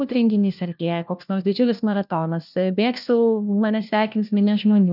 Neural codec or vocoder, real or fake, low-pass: codec, 16 kHz, 1 kbps, FunCodec, trained on LibriTTS, 50 frames a second; fake; 5.4 kHz